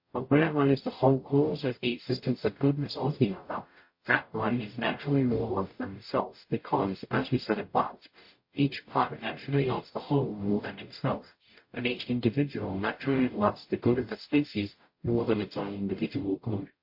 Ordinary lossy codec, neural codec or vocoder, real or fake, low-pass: MP3, 32 kbps; codec, 44.1 kHz, 0.9 kbps, DAC; fake; 5.4 kHz